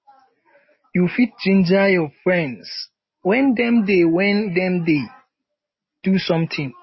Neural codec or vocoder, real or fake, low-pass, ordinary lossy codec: none; real; 7.2 kHz; MP3, 24 kbps